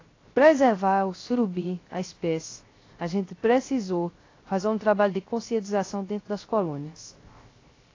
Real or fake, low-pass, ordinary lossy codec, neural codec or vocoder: fake; 7.2 kHz; AAC, 32 kbps; codec, 16 kHz, 0.3 kbps, FocalCodec